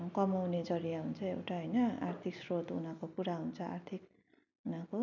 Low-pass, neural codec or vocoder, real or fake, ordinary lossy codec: 7.2 kHz; none; real; none